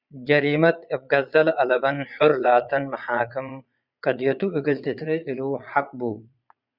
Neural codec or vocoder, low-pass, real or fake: vocoder, 22.05 kHz, 80 mel bands, Vocos; 5.4 kHz; fake